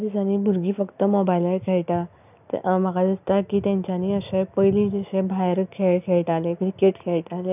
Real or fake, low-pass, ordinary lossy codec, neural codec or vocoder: real; 3.6 kHz; none; none